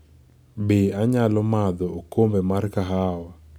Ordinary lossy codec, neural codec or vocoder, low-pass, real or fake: none; none; none; real